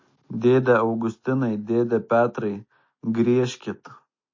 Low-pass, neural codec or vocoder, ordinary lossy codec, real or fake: 7.2 kHz; none; MP3, 32 kbps; real